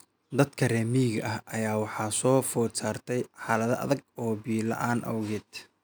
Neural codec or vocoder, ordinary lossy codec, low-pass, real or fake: none; none; none; real